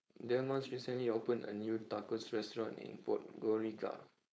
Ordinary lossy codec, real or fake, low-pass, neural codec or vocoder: none; fake; none; codec, 16 kHz, 4.8 kbps, FACodec